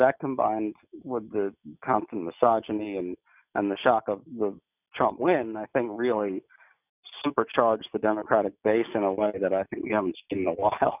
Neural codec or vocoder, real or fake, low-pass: none; real; 3.6 kHz